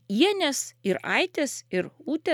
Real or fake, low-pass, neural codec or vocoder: real; 19.8 kHz; none